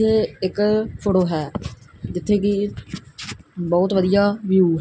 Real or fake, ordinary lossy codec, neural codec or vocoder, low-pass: real; none; none; none